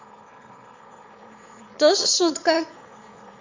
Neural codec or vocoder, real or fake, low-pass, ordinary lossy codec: autoencoder, 22.05 kHz, a latent of 192 numbers a frame, VITS, trained on one speaker; fake; 7.2 kHz; MP3, 48 kbps